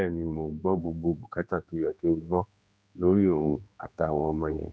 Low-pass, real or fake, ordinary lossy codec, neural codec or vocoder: none; fake; none; codec, 16 kHz, 2 kbps, X-Codec, HuBERT features, trained on general audio